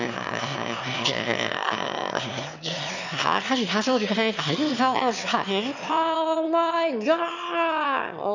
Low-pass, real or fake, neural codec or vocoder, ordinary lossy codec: 7.2 kHz; fake; autoencoder, 22.05 kHz, a latent of 192 numbers a frame, VITS, trained on one speaker; none